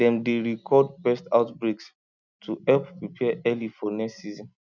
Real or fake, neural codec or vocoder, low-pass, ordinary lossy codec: real; none; 7.2 kHz; none